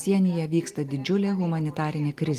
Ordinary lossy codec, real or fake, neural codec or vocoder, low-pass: Opus, 32 kbps; real; none; 14.4 kHz